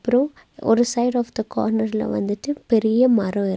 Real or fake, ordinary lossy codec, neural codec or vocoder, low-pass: real; none; none; none